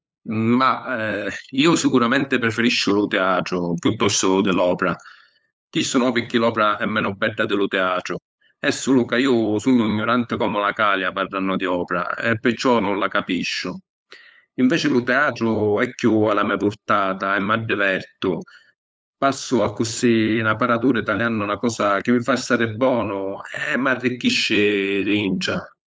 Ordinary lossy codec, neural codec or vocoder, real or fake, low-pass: none; codec, 16 kHz, 8 kbps, FunCodec, trained on LibriTTS, 25 frames a second; fake; none